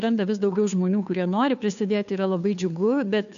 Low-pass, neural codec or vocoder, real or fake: 7.2 kHz; codec, 16 kHz, 2 kbps, FunCodec, trained on Chinese and English, 25 frames a second; fake